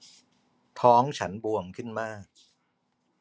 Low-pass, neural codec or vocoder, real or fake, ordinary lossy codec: none; none; real; none